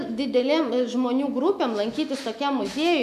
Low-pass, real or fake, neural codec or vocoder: 14.4 kHz; fake; autoencoder, 48 kHz, 128 numbers a frame, DAC-VAE, trained on Japanese speech